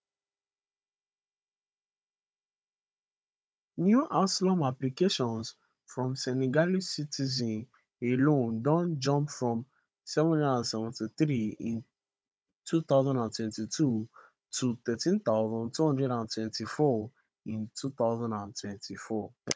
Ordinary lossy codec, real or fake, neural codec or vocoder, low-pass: none; fake; codec, 16 kHz, 16 kbps, FunCodec, trained on Chinese and English, 50 frames a second; none